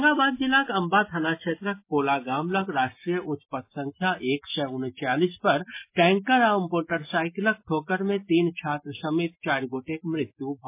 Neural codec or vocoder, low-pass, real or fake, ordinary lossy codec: none; 3.6 kHz; real; MP3, 24 kbps